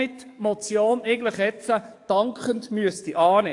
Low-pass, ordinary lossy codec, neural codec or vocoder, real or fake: 10.8 kHz; AAC, 48 kbps; codec, 44.1 kHz, 7.8 kbps, DAC; fake